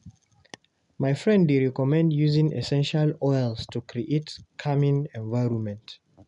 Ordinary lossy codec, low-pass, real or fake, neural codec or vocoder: none; 10.8 kHz; real; none